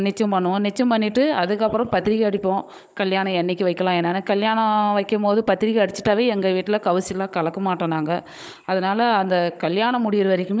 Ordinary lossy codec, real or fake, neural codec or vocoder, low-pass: none; fake; codec, 16 kHz, 16 kbps, FunCodec, trained on Chinese and English, 50 frames a second; none